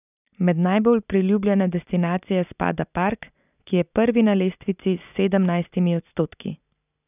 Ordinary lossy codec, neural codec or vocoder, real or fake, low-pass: none; none; real; 3.6 kHz